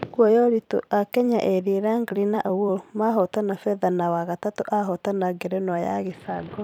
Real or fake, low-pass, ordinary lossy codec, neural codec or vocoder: fake; 19.8 kHz; none; vocoder, 44.1 kHz, 128 mel bands every 256 samples, BigVGAN v2